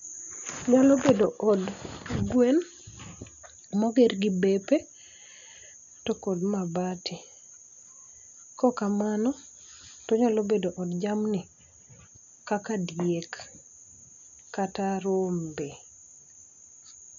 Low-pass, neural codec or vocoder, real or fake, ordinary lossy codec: 7.2 kHz; none; real; none